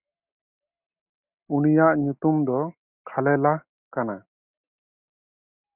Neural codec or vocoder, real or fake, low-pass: none; real; 3.6 kHz